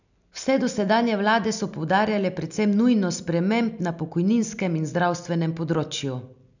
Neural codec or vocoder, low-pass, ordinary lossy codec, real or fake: none; 7.2 kHz; none; real